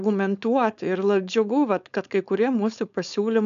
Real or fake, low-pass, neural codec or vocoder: fake; 7.2 kHz; codec, 16 kHz, 4.8 kbps, FACodec